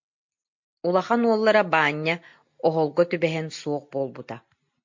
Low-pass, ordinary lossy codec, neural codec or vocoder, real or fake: 7.2 kHz; MP3, 48 kbps; none; real